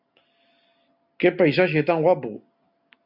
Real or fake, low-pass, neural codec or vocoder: real; 5.4 kHz; none